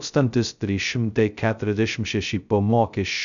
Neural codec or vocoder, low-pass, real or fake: codec, 16 kHz, 0.2 kbps, FocalCodec; 7.2 kHz; fake